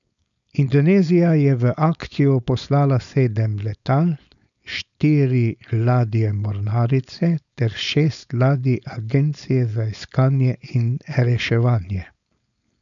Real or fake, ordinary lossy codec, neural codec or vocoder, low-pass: fake; none; codec, 16 kHz, 4.8 kbps, FACodec; 7.2 kHz